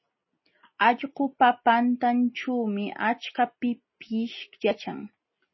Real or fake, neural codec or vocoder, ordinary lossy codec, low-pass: real; none; MP3, 24 kbps; 7.2 kHz